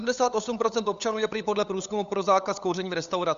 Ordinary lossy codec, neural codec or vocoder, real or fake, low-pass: Opus, 64 kbps; codec, 16 kHz, 8 kbps, FunCodec, trained on LibriTTS, 25 frames a second; fake; 7.2 kHz